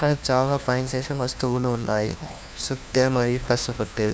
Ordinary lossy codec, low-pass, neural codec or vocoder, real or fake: none; none; codec, 16 kHz, 1 kbps, FunCodec, trained on LibriTTS, 50 frames a second; fake